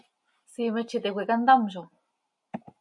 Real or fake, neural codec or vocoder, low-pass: real; none; 10.8 kHz